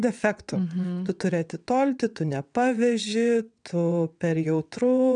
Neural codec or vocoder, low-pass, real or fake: vocoder, 22.05 kHz, 80 mel bands, WaveNeXt; 9.9 kHz; fake